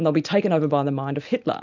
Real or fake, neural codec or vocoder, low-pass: real; none; 7.2 kHz